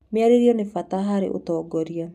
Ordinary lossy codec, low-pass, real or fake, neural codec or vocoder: none; 14.4 kHz; real; none